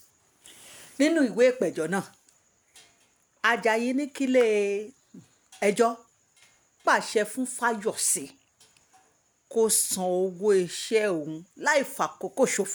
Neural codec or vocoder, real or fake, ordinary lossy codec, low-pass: none; real; none; none